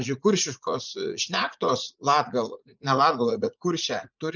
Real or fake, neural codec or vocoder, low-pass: fake; vocoder, 44.1 kHz, 80 mel bands, Vocos; 7.2 kHz